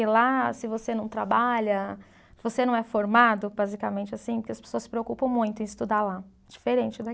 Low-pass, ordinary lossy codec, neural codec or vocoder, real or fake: none; none; none; real